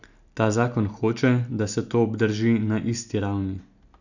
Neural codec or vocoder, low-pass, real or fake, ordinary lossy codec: none; 7.2 kHz; real; none